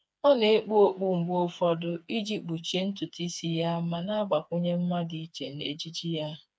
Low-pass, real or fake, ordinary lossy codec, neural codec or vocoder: none; fake; none; codec, 16 kHz, 4 kbps, FreqCodec, smaller model